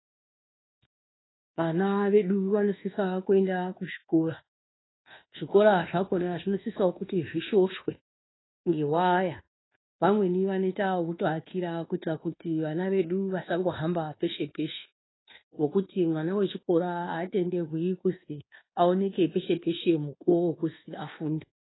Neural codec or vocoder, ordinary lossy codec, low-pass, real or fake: codec, 24 kHz, 1.2 kbps, DualCodec; AAC, 16 kbps; 7.2 kHz; fake